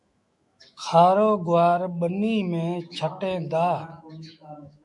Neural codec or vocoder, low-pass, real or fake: autoencoder, 48 kHz, 128 numbers a frame, DAC-VAE, trained on Japanese speech; 10.8 kHz; fake